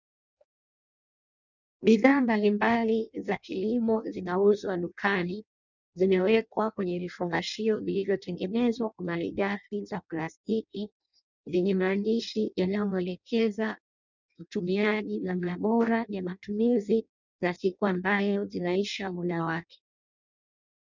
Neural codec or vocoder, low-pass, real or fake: codec, 16 kHz in and 24 kHz out, 0.6 kbps, FireRedTTS-2 codec; 7.2 kHz; fake